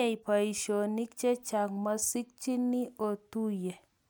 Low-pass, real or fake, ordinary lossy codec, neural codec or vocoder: none; real; none; none